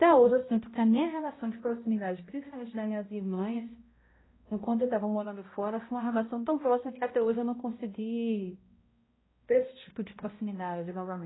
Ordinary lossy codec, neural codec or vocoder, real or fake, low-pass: AAC, 16 kbps; codec, 16 kHz, 0.5 kbps, X-Codec, HuBERT features, trained on balanced general audio; fake; 7.2 kHz